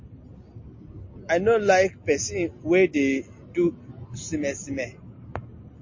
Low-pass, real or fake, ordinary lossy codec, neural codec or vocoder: 7.2 kHz; real; MP3, 32 kbps; none